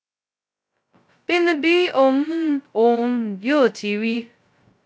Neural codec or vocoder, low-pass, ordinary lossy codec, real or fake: codec, 16 kHz, 0.2 kbps, FocalCodec; none; none; fake